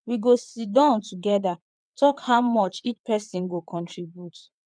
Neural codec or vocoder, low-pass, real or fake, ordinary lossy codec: vocoder, 22.05 kHz, 80 mel bands, WaveNeXt; 9.9 kHz; fake; MP3, 96 kbps